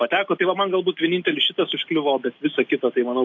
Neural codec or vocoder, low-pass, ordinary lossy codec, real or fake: none; 7.2 kHz; AAC, 48 kbps; real